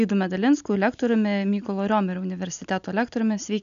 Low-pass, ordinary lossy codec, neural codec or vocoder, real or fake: 7.2 kHz; AAC, 96 kbps; none; real